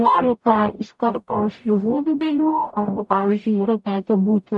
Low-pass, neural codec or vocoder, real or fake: 10.8 kHz; codec, 44.1 kHz, 0.9 kbps, DAC; fake